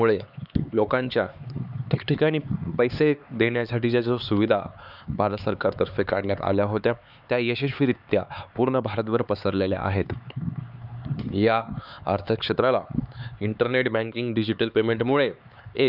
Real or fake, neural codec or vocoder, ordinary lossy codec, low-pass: fake; codec, 16 kHz, 4 kbps, X-Codec, HuBERT features, trained on LibriSpeech; none; 5.4 kHz